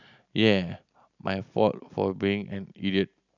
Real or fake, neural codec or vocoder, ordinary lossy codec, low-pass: real; none; none; 7.2 kHz